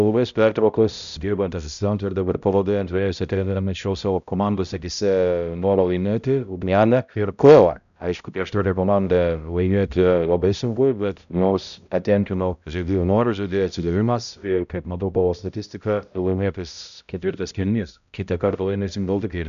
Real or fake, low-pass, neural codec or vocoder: fake; 7.2 kHz; codec, 16 kHz, 0.5 kbps, X-Codec, HuBERT features, trained on balanced general audio